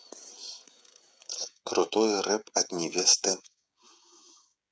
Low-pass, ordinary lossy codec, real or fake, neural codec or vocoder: none; none; fake; codec, 16 kHz, 16 kbps, FreqCodec, smaller model